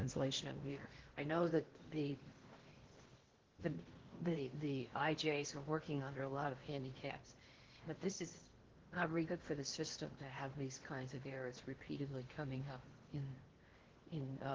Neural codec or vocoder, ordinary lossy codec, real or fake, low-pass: codec, 16 kHz in and 24 kHz out, 0.8 kbps, FocalCodec, streaming, 65536 codes; Opus, 16 kbps; fake; 7.2 kHz